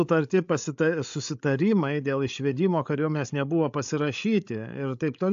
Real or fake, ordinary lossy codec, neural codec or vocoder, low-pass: fake; AAC, 64 kbps; codec, 16 kHz, 8 kbps, FreqCodec, larger model; 7.2 kHz